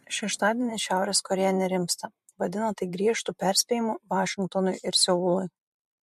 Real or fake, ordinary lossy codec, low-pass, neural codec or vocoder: fake; MP3, 64 kbps; 14.4 kHz; vocoder, 44.1 kHz, 128 mel bands every 512 samples, BigVGAN v2